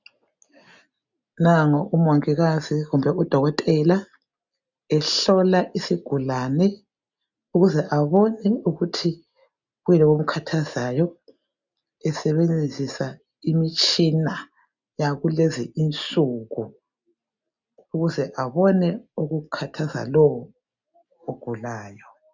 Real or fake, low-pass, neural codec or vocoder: real; 7.2 kHz; none